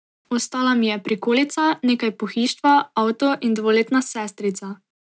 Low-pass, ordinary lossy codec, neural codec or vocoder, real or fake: none; none; none; real